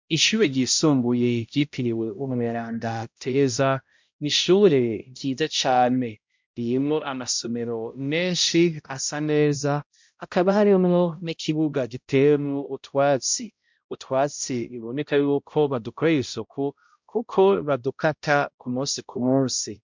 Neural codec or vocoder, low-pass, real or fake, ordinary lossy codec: codec, 16 kHz, 0.5 kbps, X-Codec, HuBERT features, trained on balanced general audio; 7.2 kHz; fake; MP3, 64 kbps